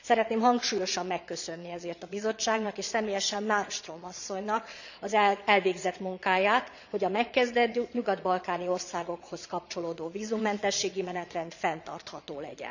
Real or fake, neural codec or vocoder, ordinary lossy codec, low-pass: fake; vocoder, 22.05 kHz, 80 mel bands, Vocos; none; 7.2 kHz